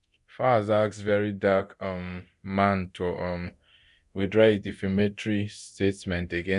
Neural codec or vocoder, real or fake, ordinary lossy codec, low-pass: codec, 24 kHz, 0.9 kbps, DualCodec; fake; none; 10.8 kHz